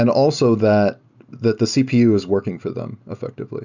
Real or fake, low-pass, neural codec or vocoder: real; 7.2 kHz; none